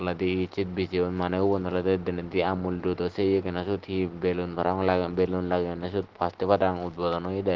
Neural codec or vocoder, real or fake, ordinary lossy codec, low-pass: none; real; Opus, 16 kbps; 7.2 kHz